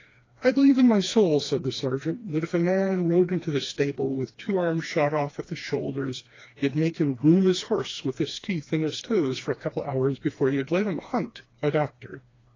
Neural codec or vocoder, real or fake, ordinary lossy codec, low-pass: codec, 16 kHz, 2 kbps, FreqCodec, smaller model; fake; AAC, 32 kbps; 7.2 kHz